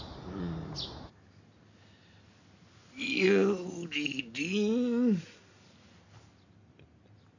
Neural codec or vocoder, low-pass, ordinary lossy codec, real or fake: none; 7.2 kHz; none; real